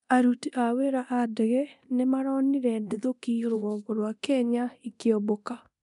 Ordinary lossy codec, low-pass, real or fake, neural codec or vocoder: none; 10.8 kHz; fake; codec, 24 kHz, 0.9 kbps, DualCodec